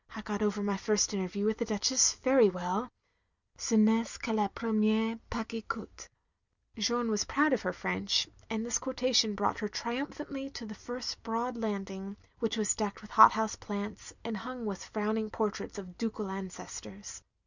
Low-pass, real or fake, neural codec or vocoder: 7.2 kHz; real; none